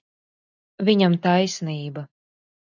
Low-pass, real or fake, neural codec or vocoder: 7.2 kHz; real; none